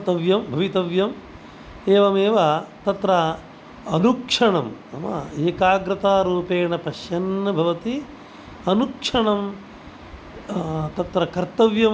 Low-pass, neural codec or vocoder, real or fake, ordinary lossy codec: none; none; real; none